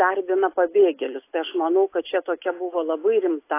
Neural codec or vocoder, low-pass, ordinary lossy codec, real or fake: none; 3.6 kHz; AAC, 24 kbps; real